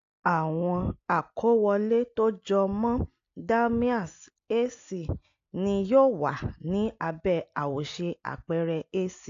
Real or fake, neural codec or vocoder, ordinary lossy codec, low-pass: real; none; AAC, 48 kbps; 7.2 kHz